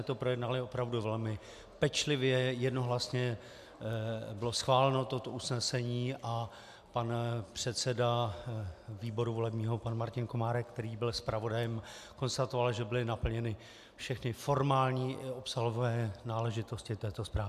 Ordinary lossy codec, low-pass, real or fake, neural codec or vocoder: MP3, 96 kbps; 14.4 kHz; real; none